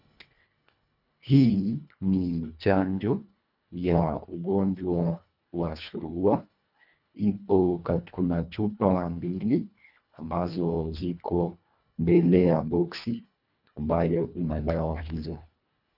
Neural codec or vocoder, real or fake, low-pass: codec, 24 kHz, 1.5 kbps, HILCodec; fake; 5.4 kHz